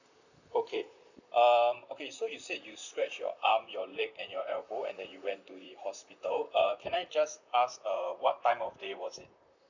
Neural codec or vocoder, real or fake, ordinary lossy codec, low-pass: vocoder, 44.1 kHz, 128 mel bands, Pupu-Vocoder; fake; none; 7.2 kHz